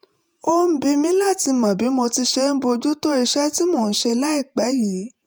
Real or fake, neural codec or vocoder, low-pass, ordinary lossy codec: fake; vocoder, 48 kHz, 128 mel bands, Vocos; none; none